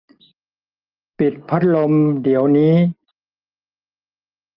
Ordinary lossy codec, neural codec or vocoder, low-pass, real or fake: Opus, 24 kbps; none; 5.4 kHz; real